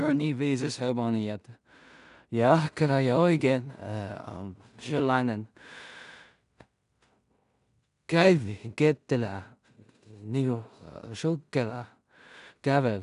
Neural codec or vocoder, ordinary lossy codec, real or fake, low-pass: codec, 16 kHz in and 24 kHz out, 0.4 kbps, LongCat-Audio-Codec, two codebook decoder; none; fake; 10.8 kHz